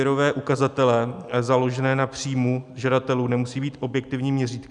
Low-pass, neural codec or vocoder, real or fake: 10.8 kHz; none; real